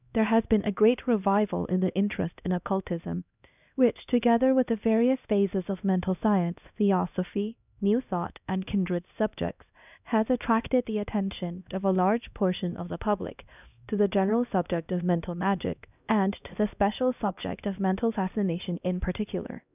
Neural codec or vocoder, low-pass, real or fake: codec, 16 kHz, 1 kbps, X-Codec, HuBERT features, trained on LibriSpeech; 3.6 kHz; fake